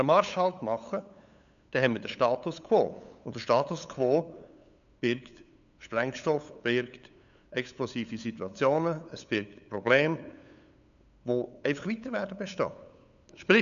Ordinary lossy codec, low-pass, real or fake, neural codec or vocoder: none; 7.2 kHz; fake; codec, 16 kHz, 8 kbps, FunCodec, trained on LibriTTS, 25 frames a second